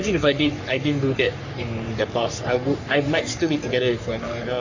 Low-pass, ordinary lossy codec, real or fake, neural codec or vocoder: 7.2 kHz; AAC, 48 kbps; fake; codec, 44.1 kHz, 3.4 kbps, Pupu-Codec